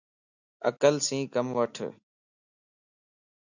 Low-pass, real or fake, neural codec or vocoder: 7.2 kHz; real; none